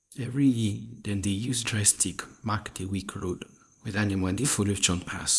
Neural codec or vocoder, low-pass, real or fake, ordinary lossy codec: codec, 24 kHz, 0.9 kbps, WavTokenizer, small release; none; fake; none